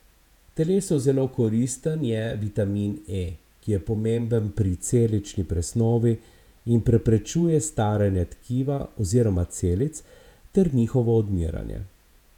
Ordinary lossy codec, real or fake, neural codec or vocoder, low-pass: none; fake; vocoder, 48 kHz, 128 mel bands, Vocos; 19.8 kHz